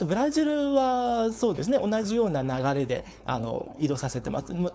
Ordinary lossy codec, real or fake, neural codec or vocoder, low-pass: none; fake; codec, 16 kHz, 4.8 kbps, FACodec; none